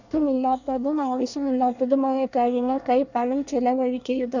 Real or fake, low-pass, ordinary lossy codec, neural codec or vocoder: fake; 7.2 kHz; none; codec, 24 kHz, 1 kbps, SNAC